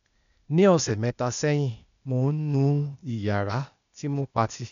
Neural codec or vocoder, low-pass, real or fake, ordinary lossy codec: codec, 16 kHz, 0.8 kbps, ZipCodec; 7.2 kHz; fake; none